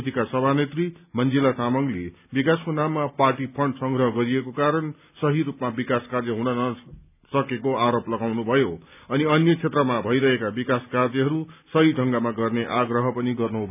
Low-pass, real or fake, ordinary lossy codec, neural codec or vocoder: 3.6 kHz; real; none; none